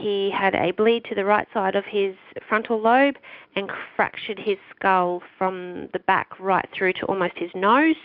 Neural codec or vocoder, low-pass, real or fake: none; 5.4 kHz; real